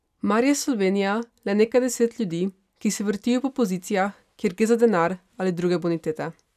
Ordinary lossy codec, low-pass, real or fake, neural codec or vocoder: none; 14.4 kHz; real; none